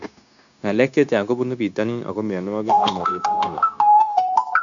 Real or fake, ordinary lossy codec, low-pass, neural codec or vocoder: fake; none; 7.2 kHz; codec, 16 kHz, 0.9 kbps, LongCat-Audio-Codec